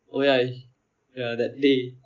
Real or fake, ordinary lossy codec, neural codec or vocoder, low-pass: real; Opus, 24 kbps; none; 7.2 kHz